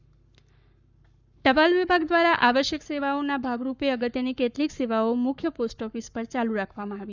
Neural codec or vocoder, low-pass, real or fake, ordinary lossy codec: codec, 44.1 kHz, 7.8 kbps, Pupu-Codec; 7.2 kHz; fake; none